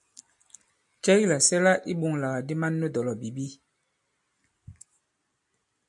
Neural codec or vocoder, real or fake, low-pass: none; real; 10.8 kHz